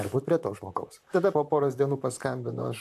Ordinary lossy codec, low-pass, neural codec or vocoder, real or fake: MP3, 96 kbps; 14.4 kHz; vocoder, 44.1 kHz, 128 mel bands, Pupu-Vocoder; fake